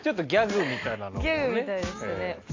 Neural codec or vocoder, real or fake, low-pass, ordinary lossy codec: none; real; 7.2 kHz; AAC, 32 kbps